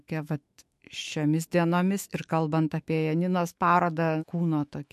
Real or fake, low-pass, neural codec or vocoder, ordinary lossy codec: fake; 14.4 kHz; autoencoder, 48 kHz, 128 numbers a frame, DAC-VAE, trained on Japanese speech; MP3, 64 kbps